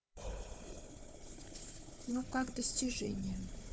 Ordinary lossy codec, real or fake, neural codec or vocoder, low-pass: none; fake; codec, 16 kHz, 4 kbps, FunCodec, trained on Chinese and English, 50 frames a second; none